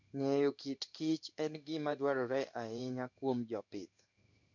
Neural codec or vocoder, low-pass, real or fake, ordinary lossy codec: codec, 16 kHz in and 24 kHz out, 1 kbps, XY-Tokenizer; 7.2 kHz; fake; none